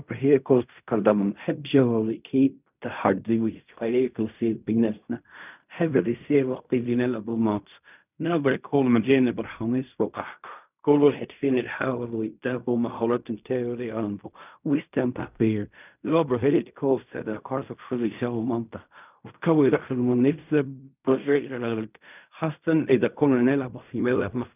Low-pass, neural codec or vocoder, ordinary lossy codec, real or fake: 3.6 kHz; codec, 16 kHz in and 24 kHz out, 0.4 kbps, LongCat-Audio-Codec, fine tuned four codebook decoder; none; fake